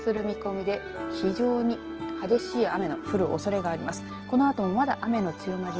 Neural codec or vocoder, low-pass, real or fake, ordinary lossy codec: none; 7.2 kHz; real; Opus, 16 kbps